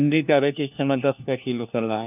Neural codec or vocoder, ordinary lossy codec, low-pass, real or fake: codec, 16 kHz, 1 kbps, FunCodec, trained on LibriTTS, 50 frames a second; none; 3.6 kHz; fake